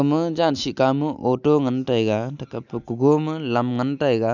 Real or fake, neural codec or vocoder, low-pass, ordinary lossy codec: real; none; 7.2 kHz; none